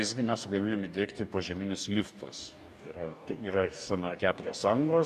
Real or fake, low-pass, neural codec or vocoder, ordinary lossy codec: fake; 14.4 kHz; codec, 44.1 kHz, 2.6 kbps, DAC; AAC, 96 kbps